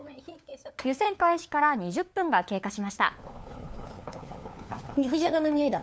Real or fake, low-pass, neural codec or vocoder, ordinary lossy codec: fake; none; codec, 16 kHz, 4 kbps, FunCodec, trained on LibriTTS, 50 frames a second; none